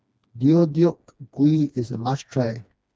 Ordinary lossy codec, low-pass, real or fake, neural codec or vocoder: none; none; fake; codec, 16 kHz, 2 kbps, FreqCodec, smaller model